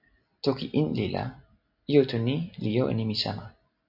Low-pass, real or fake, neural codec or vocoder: 5.4 kHz; real; none